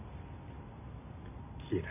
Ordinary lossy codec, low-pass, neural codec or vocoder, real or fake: none; 3.6 kHz; none; real